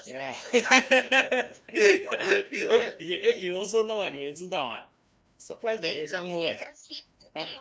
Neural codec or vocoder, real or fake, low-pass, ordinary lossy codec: codec, 16 kHz, 1 kbps, FreqCodec, larger model; fake; none; none